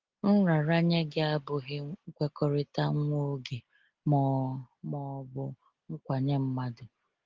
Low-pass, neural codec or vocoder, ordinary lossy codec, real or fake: 7.2 kHz; none; Opus, 16 kbps; real